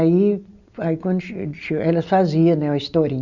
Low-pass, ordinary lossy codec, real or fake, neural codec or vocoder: 7.2 kHz; Opus, 64 kbps; real; none